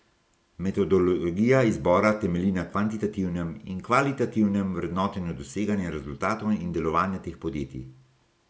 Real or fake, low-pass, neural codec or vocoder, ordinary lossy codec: real; none; none; none